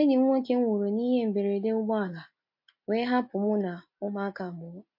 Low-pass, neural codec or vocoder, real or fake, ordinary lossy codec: 5.4 kHz; none; real; MP3, 32 kbps